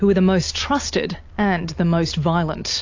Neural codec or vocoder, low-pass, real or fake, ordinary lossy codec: none; 7.2 kHz; real; AAC, 48 kbps